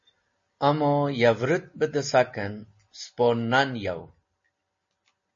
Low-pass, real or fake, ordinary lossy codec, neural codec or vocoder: 7.2 kHz; real; MP3, 32 kbps; none